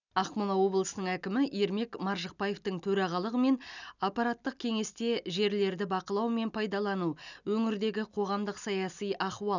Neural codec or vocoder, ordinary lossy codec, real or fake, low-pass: none; none; real; 7.2 kHz